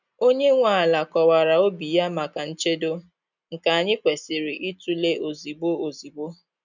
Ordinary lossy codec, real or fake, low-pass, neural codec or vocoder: none; real; 7.2 kHz; none